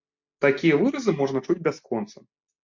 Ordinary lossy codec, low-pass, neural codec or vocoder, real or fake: MP3, 48 kbps; 7.2 kHz; none; real